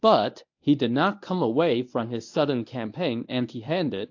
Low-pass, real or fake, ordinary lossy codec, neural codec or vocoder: 7.2 kHz; fake; AAC, 48 kbps; codec, 24 kHz, 0.9 kbps, WavTokenizer, medium speech release version 1